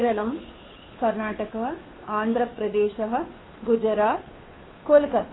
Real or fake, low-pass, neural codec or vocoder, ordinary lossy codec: fake; 7.2 kHz; codec, 16 kHz, 2 kbps, FunCodec, trained on Chinese and English, 25 frames a second; AAC, 16 kbps